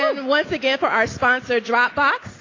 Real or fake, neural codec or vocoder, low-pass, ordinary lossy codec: real; none; 7.2 kHz; AAC, 32 kbps